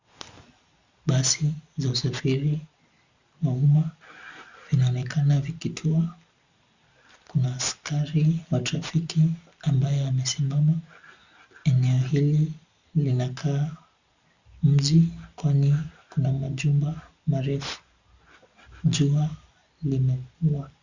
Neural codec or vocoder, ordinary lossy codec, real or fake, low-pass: none; Opus, 64 kbps; real; 7.2 kHz